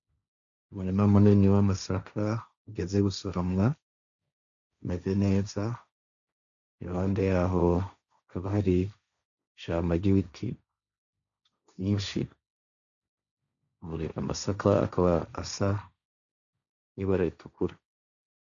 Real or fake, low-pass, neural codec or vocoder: fake; 7.2 kHz; codec, 16 kHz, 1.1 kbps, Voila-Tokenizer